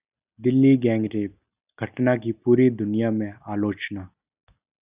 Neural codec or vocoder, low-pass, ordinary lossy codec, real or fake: none; 3.6 kHz; Opus, 64 kbps; real